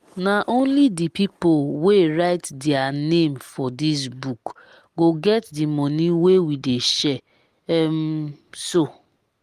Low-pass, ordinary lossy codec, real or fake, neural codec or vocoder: 14.4 kHz; Opus, 24 kbps; real; none